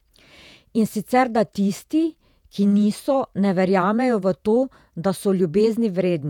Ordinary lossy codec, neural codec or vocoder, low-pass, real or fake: none; vocoder, 48 kHz, 128 mel bands, Vocos; 19.8 kHz; fake